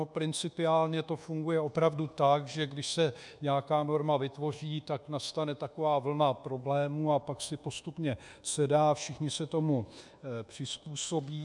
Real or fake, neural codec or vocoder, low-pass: fake; codec, 24 kHz, 1.2 kbps, DualCodec; 10.8 kHz